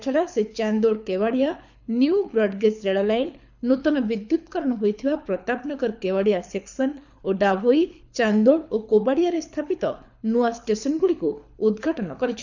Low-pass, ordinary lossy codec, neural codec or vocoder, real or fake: 7.2 kHz; none; codec, 24 kHz, 6 kbps, HILCodec; fake